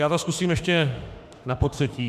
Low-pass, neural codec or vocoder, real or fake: 14.4 kHz; autoencoder, 48 kHz, 32 numbers a frame, DAC-VAE, trained on Japanese speech; fake